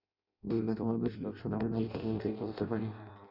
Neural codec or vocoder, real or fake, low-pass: codec, 16 kHz in and 24 kHz out, 0.6 kbps, FireRedTTS-2 codec; fake; 5.4 kHz